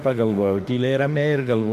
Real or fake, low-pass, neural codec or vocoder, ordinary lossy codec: fake; 14.4 kHz; autoencoder, 48 kHz, 32 numbers a frame, DAC-VAE, trained on Japanese speech; AAC, 64 kbps